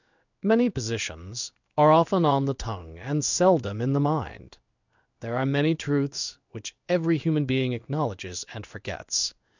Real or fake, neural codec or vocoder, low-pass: fake; codec, 16 kHz in and 24 kHz out, 1 kbps, XY-Tokenizer; 7.2 kHz